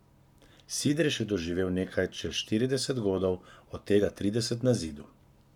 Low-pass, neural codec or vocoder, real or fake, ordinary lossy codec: 19.8 kHz; codec, 44.1 kHz, 7.8 kbps, Pupu-Codec; fake; none